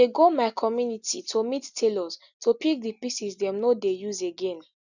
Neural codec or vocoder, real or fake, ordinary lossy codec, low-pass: none; real; none; 7.2 kHz